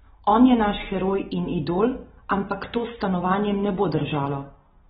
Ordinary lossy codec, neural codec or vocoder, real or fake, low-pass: AAC, 16 kbps; none; real; 7.2 kHz